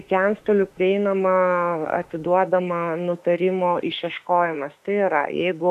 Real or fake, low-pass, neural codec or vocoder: fake; 14.4 kHz; autoencoder, 48 kHz, 32 numbers a frame, DAC-VAE, trained on Japanese speech